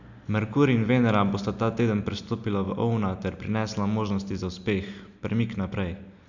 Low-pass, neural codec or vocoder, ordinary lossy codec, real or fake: 7.2 kHz; none; none; real